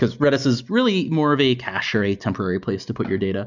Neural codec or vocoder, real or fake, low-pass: none; real; 7.2 kHz